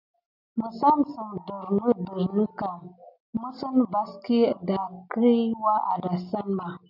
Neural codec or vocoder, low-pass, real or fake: none; 5.4 kHz; real